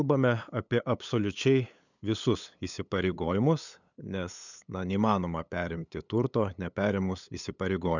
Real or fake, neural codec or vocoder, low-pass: fake; codec, 16 kHz, 8 kbps, FunCodec, trained on LibriTTS, 25 frames a second; 7.2 kHz